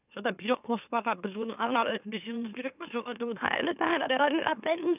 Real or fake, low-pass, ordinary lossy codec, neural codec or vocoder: fake; 3.6 kHz; none; autoencoder, 44.1 kHz, a latent of 192 numbers a frame, MeloTTS